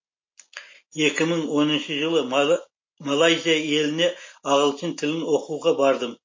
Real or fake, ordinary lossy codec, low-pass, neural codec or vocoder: real; MP3, 32 kbps; 7.2 kHz; none